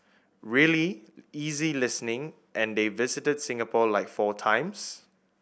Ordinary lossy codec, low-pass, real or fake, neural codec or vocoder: none; none; real; none